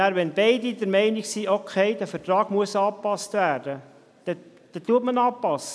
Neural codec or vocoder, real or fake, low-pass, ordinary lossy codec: none; real; none; none